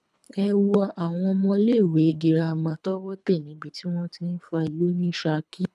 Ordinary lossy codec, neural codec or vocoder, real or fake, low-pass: none; codec, 24 kHz, 3 kbps, HILCodec; fake; none